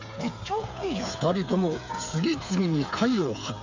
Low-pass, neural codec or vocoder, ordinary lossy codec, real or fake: 7.2 kHz; codec, 16 kHz, 8 kbps, FreqCodec, smaller model; MP3, 64 kbps; fake